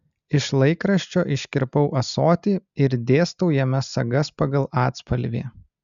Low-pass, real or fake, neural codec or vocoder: 7.2 kHz; real; none